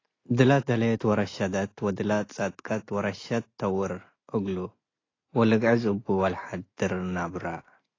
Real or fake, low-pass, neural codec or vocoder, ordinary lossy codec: real; 7.2 kHz; none; AAC, 32 kbps